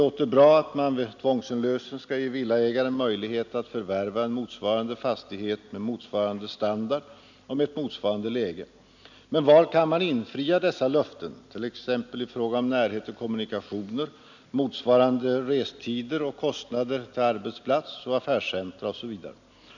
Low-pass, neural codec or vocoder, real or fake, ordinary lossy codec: 7.2 kHz; none; real; none